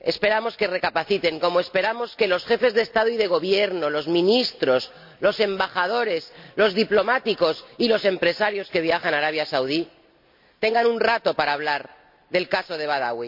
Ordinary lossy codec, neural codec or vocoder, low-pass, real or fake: MP3, 48 kbps; none; 5.4 kHz; real